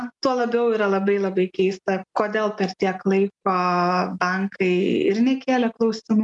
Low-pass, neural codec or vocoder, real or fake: 10.8 kHz; none; real